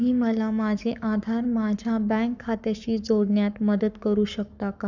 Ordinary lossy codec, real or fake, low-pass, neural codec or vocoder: none; fake; 7.2 kHz; codec, 44.1 kHz, 7.8 kbps, Pupu-Codec